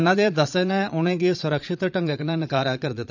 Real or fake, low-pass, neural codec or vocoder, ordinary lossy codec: fake; 7.2 kHz; vocoder, 22.05 kHz, 80 mel bands, Vocos; none